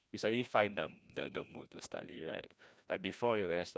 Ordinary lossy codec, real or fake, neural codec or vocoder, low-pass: none; fake; codec, 16 kHz, 1 kbps, FreqCodec, larger model; none